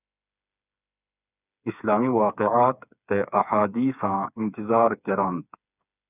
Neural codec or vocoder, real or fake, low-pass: codec, 16 kHz, 4 kbps, FreqCodec, smaller model; fake; 3.6 kHz